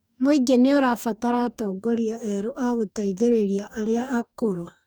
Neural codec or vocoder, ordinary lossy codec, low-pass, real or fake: codec, 44.1 kHz, 2.6 kbps, DAC; none; none; fake